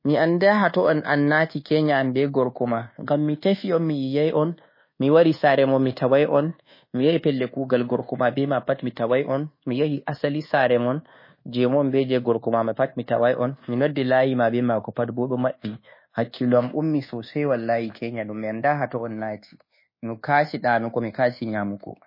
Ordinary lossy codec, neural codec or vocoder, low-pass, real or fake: MP3, 24 kbps; codec, 24 kHz, 1.2 kbps, DualCodec; 5.4 kHz; fake